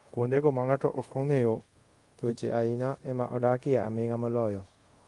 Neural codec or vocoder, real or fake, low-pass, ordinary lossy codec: codec, 24 kHz, 0.5 kbps, DualCodec; fake; 10.8 kHz; Opus, 32 kbps